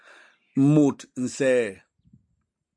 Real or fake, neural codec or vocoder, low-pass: real; none; 9.9 kHz